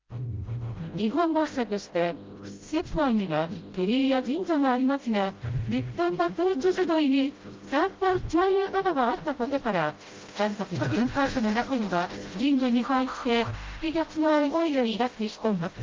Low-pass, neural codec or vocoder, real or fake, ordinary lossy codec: 7.2 kHz; codec, 16 kHz, 0.5 kbps, FreqCodec, smaller model; fake; Opus, 32 kbps